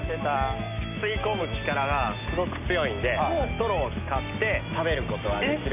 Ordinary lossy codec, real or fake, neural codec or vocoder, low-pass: none; real; none; 3.6 kHz